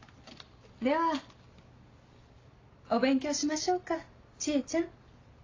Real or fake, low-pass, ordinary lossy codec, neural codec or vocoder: real; 7.2 kHz; AAC, 32 kbps; none